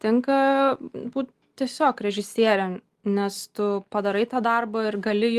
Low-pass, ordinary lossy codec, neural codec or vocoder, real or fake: 14.4 kHz; Opus, 24 kbps; none; real